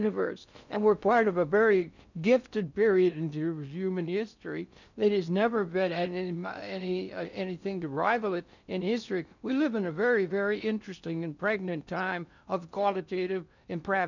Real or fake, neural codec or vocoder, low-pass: fake; codec, 16 kHz in and 24 kHz out, 0.6 kbps, FocalCodec, streaming, 4096 codes; 7.2 kHz